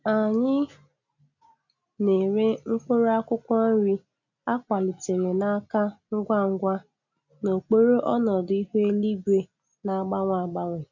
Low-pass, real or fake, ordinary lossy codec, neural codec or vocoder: 7.2 kHz; real; none; none